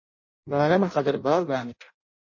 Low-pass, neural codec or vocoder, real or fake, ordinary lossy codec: 7.2 kHz; codec, 16 kHz in and 24 kHz out, 0.6 kbps, FireRedTTS-2 codec; fake; MP3, 32 kbps